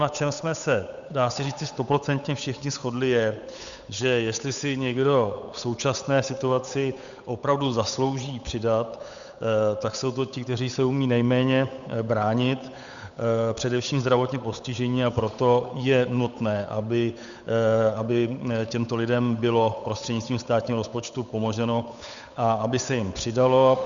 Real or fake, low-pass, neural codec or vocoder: fake; 7.2 kHz; codec, 16 kHz, 8 kbps, FunCodec, trained on Chinese and English, 25 frames a second